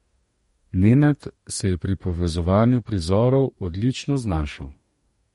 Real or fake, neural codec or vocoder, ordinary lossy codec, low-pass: fake; codec, 44.1 kHz, 2.6 kbps, DAC; MP3, 48 kbps; 19.8 kHz